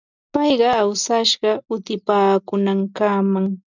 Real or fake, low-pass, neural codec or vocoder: real; 7.2 kHz; none